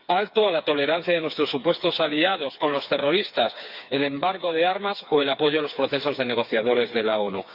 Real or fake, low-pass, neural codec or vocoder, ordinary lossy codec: fake; 5.4 kHz; codec, 16 kHz, 4 kbps, FreqCodec, smaller model; Opus, 64 kbps